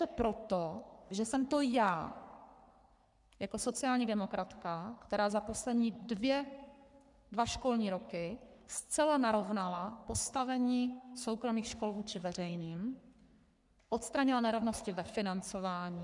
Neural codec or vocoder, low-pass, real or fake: codec, 44.1 kHz, 3.4 kbps, Pupu-Codec; 10.8 kHz; fake